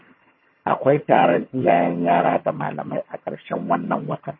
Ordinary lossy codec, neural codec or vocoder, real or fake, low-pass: MP3, 24 kbps; vocoder, 22.05 kHz, 80 mel bands, HiFi-GAN; fake; 7.2 kHz